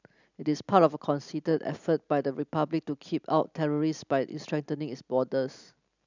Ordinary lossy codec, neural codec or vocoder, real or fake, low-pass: none; none; real; 7.2 kHz